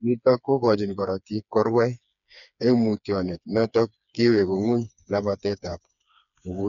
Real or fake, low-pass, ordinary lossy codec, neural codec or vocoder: fake; 7.2 kHz; none; codec, 16 kHz, 4 kbps, FreqCodec, smaller model